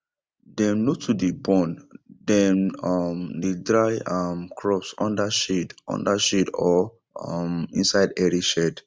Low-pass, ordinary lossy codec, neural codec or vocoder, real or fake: none; none; none; real